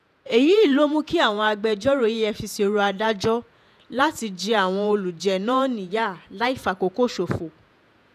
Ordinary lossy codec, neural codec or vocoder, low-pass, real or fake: none; vocoder, 48 kHz, 128 mel bands, Vocos; 14.4 kHz; fake